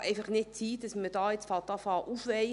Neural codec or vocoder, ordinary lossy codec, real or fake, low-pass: none; none; real; 10.8 kHz